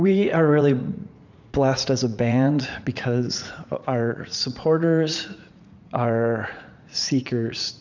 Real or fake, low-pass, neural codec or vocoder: fake; 7.2 kHz; vocoder, 44.1 kHz, 80 mel bands, Vocos